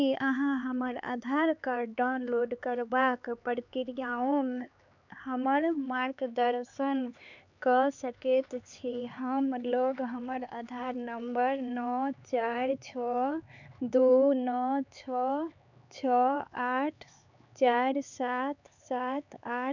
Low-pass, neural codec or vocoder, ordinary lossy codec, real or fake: 7.2 kHz; codec, 16 kHz, 4 kbps, X-Codec, HuBERT features, trained on LibriSpeech; none; fake